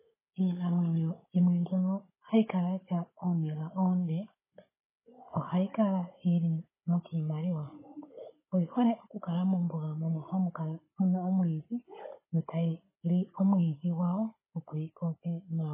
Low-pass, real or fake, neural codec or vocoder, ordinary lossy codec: 3.6 kHz; fake; codec, 16 kHz, 16 kbps, FunCodec, trained on Chinese and English, 50 frames a second; MP3, 16 kbps